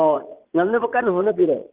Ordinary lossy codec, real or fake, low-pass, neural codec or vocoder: Opus, 16 kbps; fake; 3.6 kHz; vocoder, 44.1 kHz, 80 mel bands, Vocos